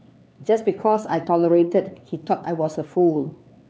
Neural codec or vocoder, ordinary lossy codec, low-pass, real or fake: codec, 16 kHz, 4 kbps, X-Codec, HuBERT features, trained on LibriSpeech; none; none; fake